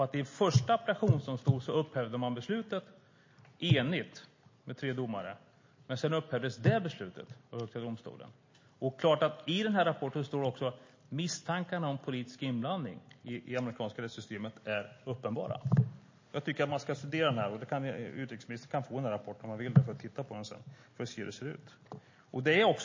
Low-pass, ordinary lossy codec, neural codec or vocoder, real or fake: 7.2 kHz; MP3, 32 kbps; none; real